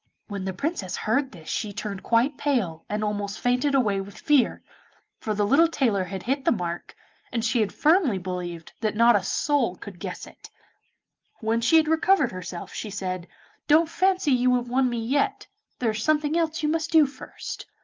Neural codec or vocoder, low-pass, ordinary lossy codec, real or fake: none; 7.2 kHz; Opus, 24 kbps; real